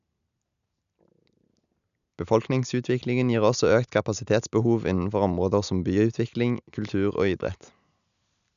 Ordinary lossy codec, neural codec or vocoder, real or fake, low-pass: none; none; real; 7.2 kHz